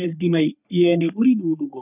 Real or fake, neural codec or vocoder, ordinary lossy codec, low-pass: fake; codec, 44.1 kHz, 3.4 kbps, Pupu-Codec; none; 3.6 kHz